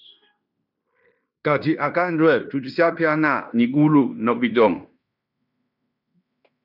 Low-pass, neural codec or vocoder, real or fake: 5.4 kHz; codec, 16 kHz in and 24 kHz out, 0.9 kbps, LongCat-Audio-Codec, fine tuned four codebook decoder; fake